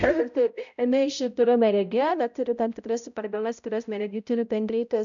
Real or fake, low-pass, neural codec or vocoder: fake; 7.2 kHz; codec, 16 kHz, 0.5 kbps, X-Codec, HuBERT features, trained on balanced general audio